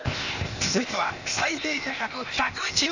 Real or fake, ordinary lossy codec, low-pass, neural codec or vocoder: fake; none; 7.2 kHz; codec, 16 kHz, 0.8 kbps, ZipCodec